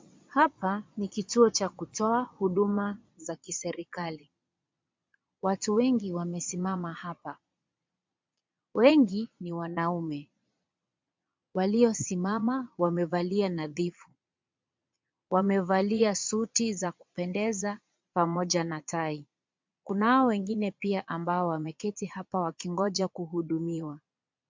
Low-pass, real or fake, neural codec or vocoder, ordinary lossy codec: 7.2 kHz; fake; vocoder, 22.05 kHz, 80 mel bands, Vocos; MP3, 64 kbps